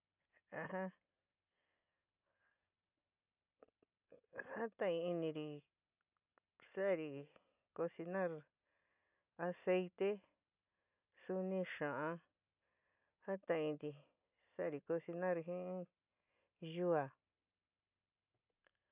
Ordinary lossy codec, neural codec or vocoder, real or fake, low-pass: none; none; real; 3.6 kHz